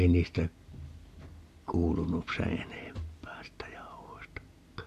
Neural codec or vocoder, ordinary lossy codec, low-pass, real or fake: none; AAC, 64 kbps; 14.4 kHz; real